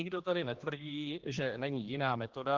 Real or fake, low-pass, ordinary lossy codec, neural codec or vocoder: fake; 7.2 kHz; Opus, 16 kbps; codec, 16 kHz, 2 kbps, X-Codec, HuBERT features, trained on general audio